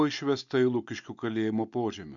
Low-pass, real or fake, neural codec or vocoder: 7.2 kHz; real; none